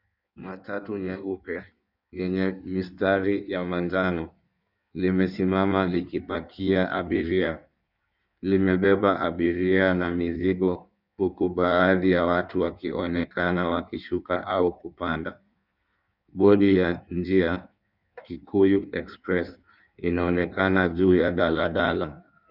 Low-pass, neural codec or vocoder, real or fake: 5.4 kHz; codec, 16 kHz in and 24 kHz out, 1.1 kbps, FireRedTTS-2 codec; fake